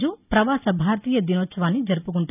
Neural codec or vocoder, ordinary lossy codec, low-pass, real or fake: none; none; 3.6 kHz; real